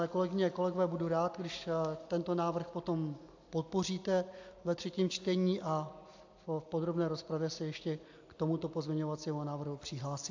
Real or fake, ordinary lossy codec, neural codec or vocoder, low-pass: real; AAC, 48 kbps; none; 7.2 kHz